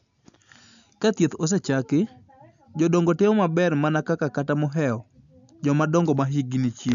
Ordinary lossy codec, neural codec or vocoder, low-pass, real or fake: none; none; 7.2 kHz; real